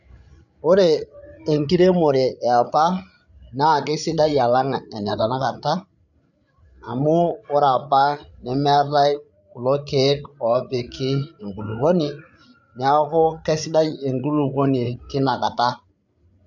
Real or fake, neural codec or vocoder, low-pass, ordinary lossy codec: fake; codec, 16 kHz, 8 kbps, FreqCodec, larger model; 7.2 kHz; none